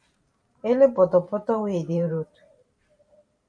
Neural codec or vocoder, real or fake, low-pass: vocoder, 44.1 kHz, 128 mel bands every 512 samples, BigVGAN v2; fake; 9.9 kHz